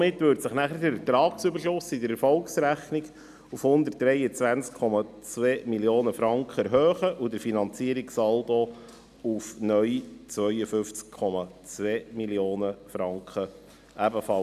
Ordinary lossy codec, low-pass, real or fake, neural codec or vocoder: none; 14.4 kHz; real; none